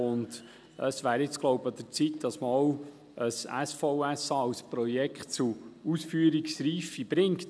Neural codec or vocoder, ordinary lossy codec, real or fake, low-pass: none; none; real; none